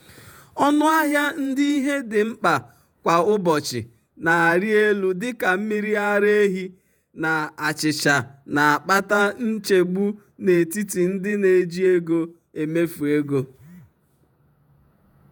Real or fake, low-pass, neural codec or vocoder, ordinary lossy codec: fake; none; vocoder, 48 kHz, 128 mel bands, Vocos; none